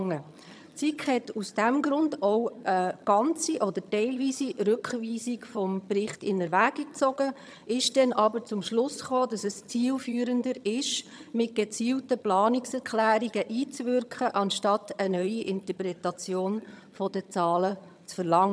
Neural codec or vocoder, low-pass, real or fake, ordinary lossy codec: vocoder, 22.05 kHz, 80 mel bands, HiFi-GAN; none; fake; none